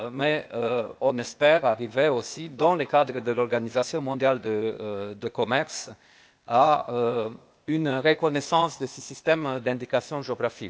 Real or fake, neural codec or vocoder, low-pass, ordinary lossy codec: fake; codec, 16 kHz, 0.8 kbps, ZipCodec; none; none